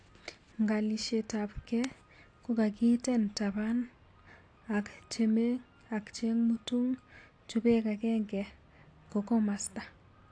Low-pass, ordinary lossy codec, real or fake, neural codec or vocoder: 9.9 kHz; AAC, 48 kbps; real; none